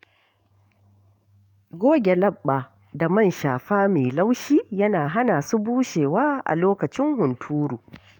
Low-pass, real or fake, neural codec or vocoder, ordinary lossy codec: 19.8 kHz; fake; codec, 44.1 kHz, 7.8 kbps, DAC; none